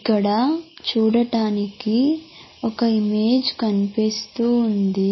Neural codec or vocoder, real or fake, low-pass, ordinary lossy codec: none; real; 7.2 kHz; MP3, 24 kbps